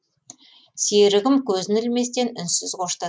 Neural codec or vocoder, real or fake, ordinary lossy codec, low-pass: none; real; none; none